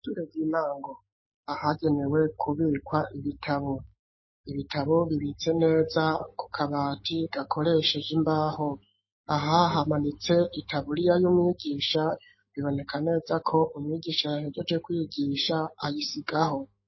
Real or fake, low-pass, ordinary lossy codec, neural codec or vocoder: fake; 7.2 kHz; MP3, 24 kbps; autoencoder, 48 kHz, 128 numbers a frame, DAC-VAE, trained on Japanese speech